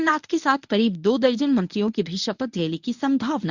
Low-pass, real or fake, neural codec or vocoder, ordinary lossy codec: 7.2 kHz; fake; codec, 24 kHz, 0.9 kbps, WavTokenizer, medium speech release version 1; none